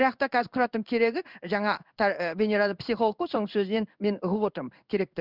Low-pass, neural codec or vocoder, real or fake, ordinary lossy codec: 5.4 kHz; codec, 16 kHz in and 24 kHz out, 1 kbps, XY-Tokenizer; fake; none